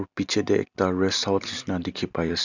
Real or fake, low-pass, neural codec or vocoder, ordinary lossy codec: real; 7.2 kHz; none; none